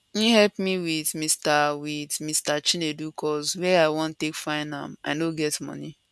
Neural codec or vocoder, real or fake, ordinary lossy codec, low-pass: none; real; none; none